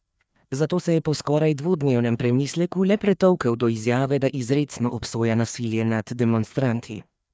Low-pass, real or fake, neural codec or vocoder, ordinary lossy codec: none; fake; codec, 16 kHz, 2 kbps, FreqCodec, larger model; none